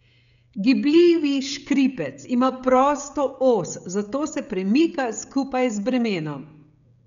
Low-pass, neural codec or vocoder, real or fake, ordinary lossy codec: 7.2 kHz; codec, 16 kHz, 16 kbps, FreqCodec, smaller model; fake; none